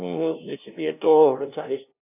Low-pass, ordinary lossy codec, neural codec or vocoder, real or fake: 3.6 kHz; none; codec, 16 kHz, 0.5 kbps, FunCodec, trained on LibriTTS, 25 frames a second; fake